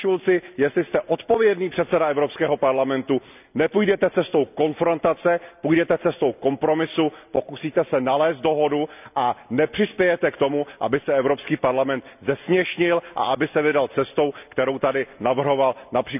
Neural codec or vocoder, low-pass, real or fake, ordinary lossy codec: none; 3.6 kHz; real; none